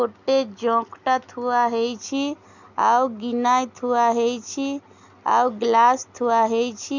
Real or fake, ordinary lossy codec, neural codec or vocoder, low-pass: real; none; none; 7.2 kHz